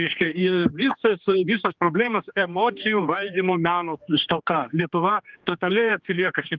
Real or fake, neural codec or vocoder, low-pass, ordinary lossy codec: fake; codec, 16 kHz, 2 kbps, X-Codec, HuBERT features, trained on general audio; 7.2 kHz; Opus, 32 kbps